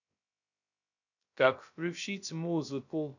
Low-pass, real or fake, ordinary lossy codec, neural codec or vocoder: 7.2 kHz; fake; none; codec, 16 kHz, 0.2 kbps, FocalCodec